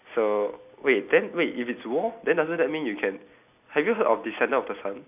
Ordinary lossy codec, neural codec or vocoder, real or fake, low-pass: none; none; real; 3.6 kHz